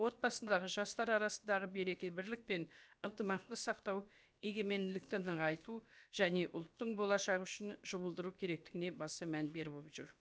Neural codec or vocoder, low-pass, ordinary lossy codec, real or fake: codec, 16 kHz, about 1 kbps, DyCAST, with the encoder's durations; none; none; fake